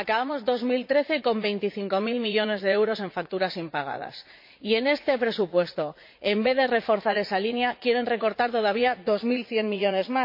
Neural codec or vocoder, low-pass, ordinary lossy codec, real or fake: vocoder, 44.1 kHz, 80 mel bands, Vocos; 5.4 kHz; MP3, 32 kbps; fake